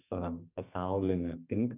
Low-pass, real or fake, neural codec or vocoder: 3.6 kHz; fake; codec, 32 kHz, 1.9 kbps, SNAC